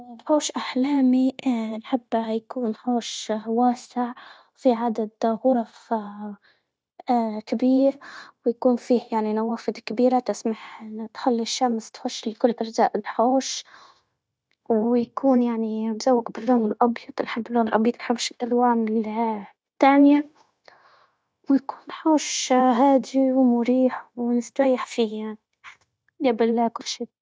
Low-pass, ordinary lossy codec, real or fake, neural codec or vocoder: none; none; fake; codec, 16 kHz, 0.9 kbps, LongCat-Audio-Codec